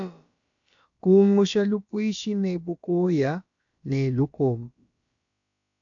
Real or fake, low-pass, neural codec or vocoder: fake; 7.2 kHz; codec, 16 kHz, about 1 kbps, DyCAST, with the encoder's durations